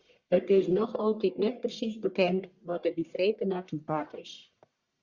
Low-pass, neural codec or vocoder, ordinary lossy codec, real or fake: 7.2 kHz; codec, 44.1 kHz, 1.7 kbps, Pupu-Codec; Opus, 64 kbps; fake